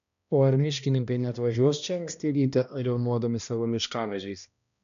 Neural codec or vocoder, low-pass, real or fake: codec, 16 kHz, 1 kbps, X-Codec, HuBERT features, trained on balanced general audio; 7.2 kHz; fake